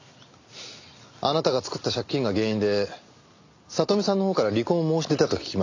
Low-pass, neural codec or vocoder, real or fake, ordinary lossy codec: 7.2 kHz; none; real; AAC, 48 kbps